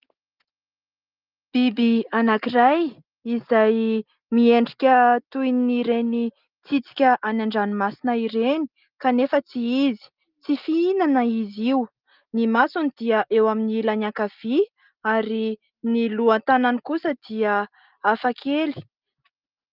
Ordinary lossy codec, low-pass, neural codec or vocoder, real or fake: Opus, 32 kbps; 5.4 kHz; none; real